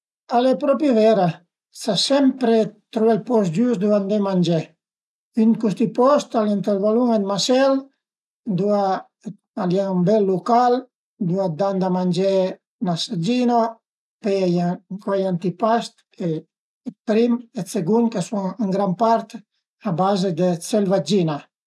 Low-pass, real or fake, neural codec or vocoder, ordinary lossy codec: none; real; none; none